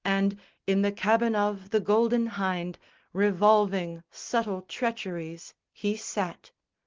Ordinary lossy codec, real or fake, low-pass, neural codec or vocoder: Opus, 16 kbps; real; 7.2 kHz; none